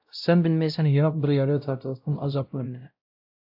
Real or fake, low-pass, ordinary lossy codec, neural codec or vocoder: fake; 5.4 kHz; AAC, 48 kbps; codec, 16 kHz, 0.5 kbps, X-Codec, WavLM features, trained on Multilingual LibriSpeech